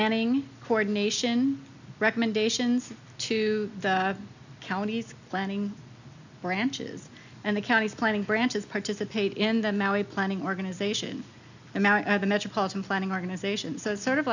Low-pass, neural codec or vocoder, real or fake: 7.2 kHz; none; real